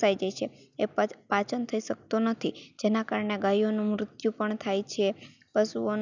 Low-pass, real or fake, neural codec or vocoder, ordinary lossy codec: 7.2 kHz; real; none; none